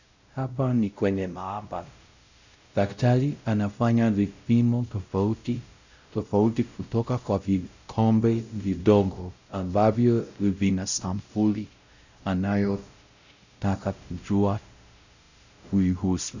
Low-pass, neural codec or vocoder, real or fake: 7.2 kHz; codec, 16 kHz, 0.5 kbps, X-Codec, WavLM features, trained on Multilingual LibriSpeech; fake